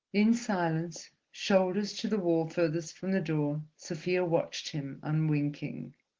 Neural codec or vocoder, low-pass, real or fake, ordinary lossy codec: none; 7.2 kHz; real; Opus, 16 kbps